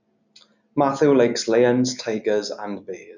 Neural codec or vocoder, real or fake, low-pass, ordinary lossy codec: none; real; 7.2 kHz; none